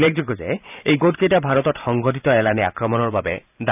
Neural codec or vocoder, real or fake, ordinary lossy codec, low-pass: vocoder, 44.1 kHz, 128 mel bands every 512 samples, BigVGAN v2; fake; none; 3.6 kHz